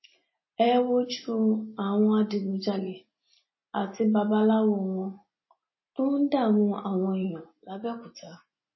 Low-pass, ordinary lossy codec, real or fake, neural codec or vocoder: 7.2 kHz; MP3, 24 kbps; real; none